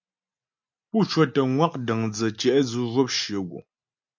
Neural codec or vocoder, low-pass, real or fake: none; 7.2 kHz; real